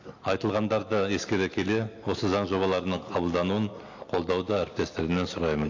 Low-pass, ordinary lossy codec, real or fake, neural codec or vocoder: 7.2 kHz; AAC, 48 kbps; real; none